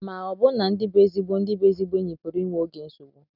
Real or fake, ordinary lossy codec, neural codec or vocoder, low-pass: real; none; none; 5.4 kHz